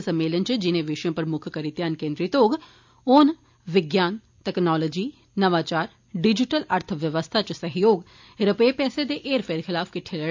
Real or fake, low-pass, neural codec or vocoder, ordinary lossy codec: real; 7.2 kHz; none; MP3, 64 kbps